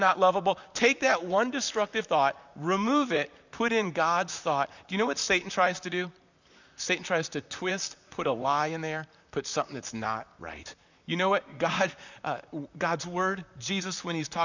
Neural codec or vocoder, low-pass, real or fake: vocoder, 44.1 kHz, 128 mel bands, Pupu-Vocoder; 7.2 kHz; fake